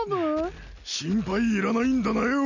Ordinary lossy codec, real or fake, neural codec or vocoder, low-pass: none; real; none; 7.2 kHz